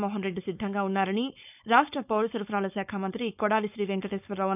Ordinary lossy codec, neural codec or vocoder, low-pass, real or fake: none; codec, 16 kHz, 4.8 kbps, FACodec; 3.6 kHz; fake